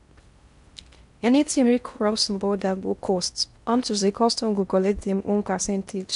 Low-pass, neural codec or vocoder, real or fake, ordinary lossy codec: 10.8 kHz; codec, 16 kHz in and 24 kHz out, 0.6 kbps, FocalCodec, streaming, 4096 codes; fake; none